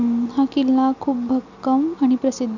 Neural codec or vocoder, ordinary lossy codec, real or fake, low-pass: none; none; real; 7.2 kHz